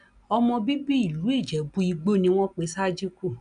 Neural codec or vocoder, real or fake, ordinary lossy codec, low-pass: none; real; none; 9.9 kHz